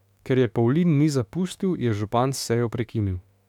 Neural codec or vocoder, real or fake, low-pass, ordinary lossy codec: autoencoder, 48 kHz, 32 numbers a frame, DAC-VAE, trained on Japanese speech; fake; 19.8 kHz; none